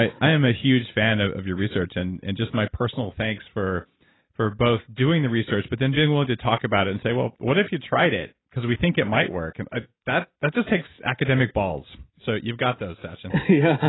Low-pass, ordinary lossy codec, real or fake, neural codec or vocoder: 7.2 kHz; AAC, 16 kbps; real; none